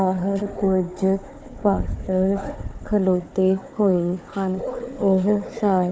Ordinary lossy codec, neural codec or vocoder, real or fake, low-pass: none; codec, 16 kHz, 4 kbps, FunCodec, trained on Chinese and English, 50 frames a second; fake; none